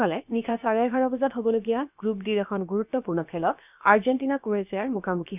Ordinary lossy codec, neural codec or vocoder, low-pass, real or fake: none; codec, 16 kHz, about 1 kbps, DyCAST, with the encoder's durations; 3.6 kHz; fake